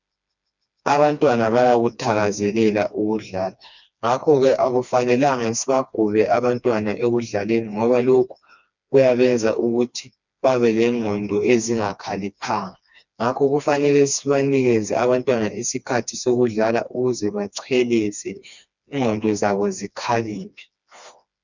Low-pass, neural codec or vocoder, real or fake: 7.2 kHz; codec, 16 kHz, 2 kbps, FreqCodec, smaller model; fake